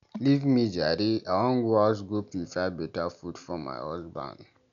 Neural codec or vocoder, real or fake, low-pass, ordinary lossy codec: none; real; 7.2 kHz; none